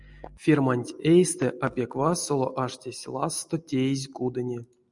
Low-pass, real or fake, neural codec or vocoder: 10.8 kHz; real; none